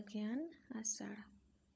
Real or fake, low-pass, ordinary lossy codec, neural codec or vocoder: fake; none; none; codec, 16 kHz, 16 kbps, FunCodec, trained on LibriTTS, 50 frames a second